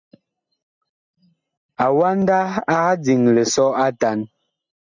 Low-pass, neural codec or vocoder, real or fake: 7.2 kHz; none; real